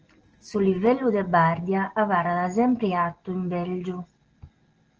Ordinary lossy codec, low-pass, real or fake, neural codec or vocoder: Opus, 16 kbps; 7.2 kHz; real; none